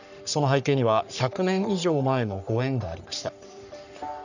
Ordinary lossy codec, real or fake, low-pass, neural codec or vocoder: none; fake; 7.2 kHz; codec, 44.1 kHz, 3.4 kbps, Pupu-Codec